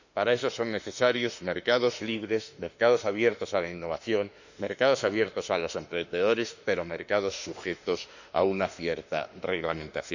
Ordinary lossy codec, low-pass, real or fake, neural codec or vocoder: none; 7.2 kHz; fake; autoencoder, 48 kHz, 32 numbers a frame, DAC-VAE, trained on Japanese speech